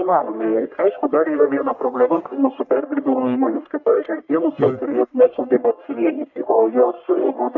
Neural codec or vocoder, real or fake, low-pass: codec, 44.1 kHz, 1.7 kbps, Pupu-Codec; fake; 7.2 kHz